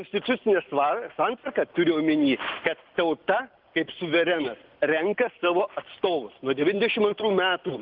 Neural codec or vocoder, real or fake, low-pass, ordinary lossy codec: none; real; 5.4 kHz; Opus, 24 kbps